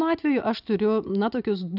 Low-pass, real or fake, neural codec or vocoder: 5.4 kHz; real; none